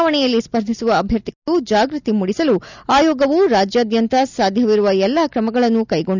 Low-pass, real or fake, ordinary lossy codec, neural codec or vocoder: 7.2 kHz; real; none; none